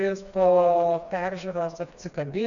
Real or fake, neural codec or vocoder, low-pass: fake; codec, 16 kHz, 2 kbps, FreqCodec, smaller model; 7.2 kHz